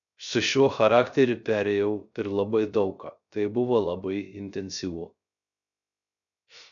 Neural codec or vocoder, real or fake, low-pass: codec, 16 kHz, 0.3 kbps, FocalCodec; fake; 7.2 kHz